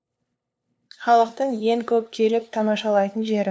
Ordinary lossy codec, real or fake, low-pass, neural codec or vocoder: none; fake; none; codec, 16 kHz, 2 kbps, FunCodec, trained on LibriTTS, 25 frames a second